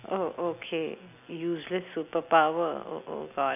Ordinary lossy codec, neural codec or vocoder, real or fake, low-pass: none; none; real; 3.6 kHz